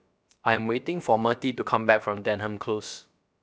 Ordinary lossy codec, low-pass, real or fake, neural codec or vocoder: none; none; fake; codec, 16 kHz, about 1 kbps, DyCAST, with the encoder's durations